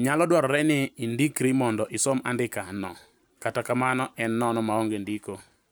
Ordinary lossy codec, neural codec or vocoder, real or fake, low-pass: none; none; real; none